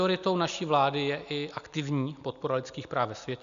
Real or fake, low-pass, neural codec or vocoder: real; 7.2 kHz; none